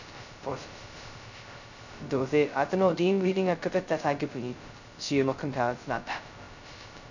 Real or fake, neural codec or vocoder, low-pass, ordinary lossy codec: fake; codec, 16 kHz, 0.2 kbps, FocalCodec; 7.2 kHz; none